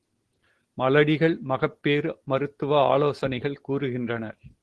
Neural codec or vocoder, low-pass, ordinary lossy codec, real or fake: none; 10.8 kHz; Opus, 16 kbps; real